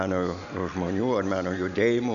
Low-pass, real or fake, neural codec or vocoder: 7.2 kHz; real; none